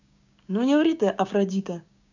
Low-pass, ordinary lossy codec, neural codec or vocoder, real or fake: 7.2 kHz; none; codec, 16 kHz, 6 kbps, DAC; fake